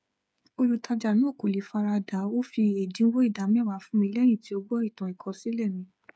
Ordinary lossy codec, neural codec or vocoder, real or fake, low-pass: none; codec, 16 kHz, 8 kbps, FreqCodec, smaller model; fake; none